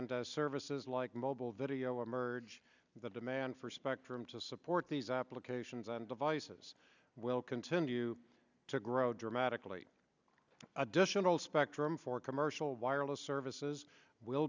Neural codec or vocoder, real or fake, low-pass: none; real; 7.2 kHz